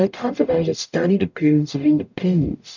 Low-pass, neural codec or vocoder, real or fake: 7.2 kHz; codec, 44.1 kHz, 0.9 kbps, DAC; fake